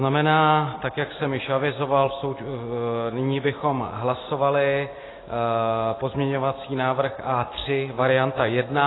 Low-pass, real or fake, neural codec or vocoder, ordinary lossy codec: 7.2 kHz; real; none; AAC, 16 kbps